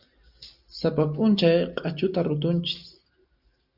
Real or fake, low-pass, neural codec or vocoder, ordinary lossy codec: real; 5.4 kHz; none; Opus, 64 kbps